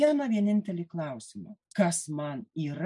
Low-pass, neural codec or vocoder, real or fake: 10.8 kHz; none; real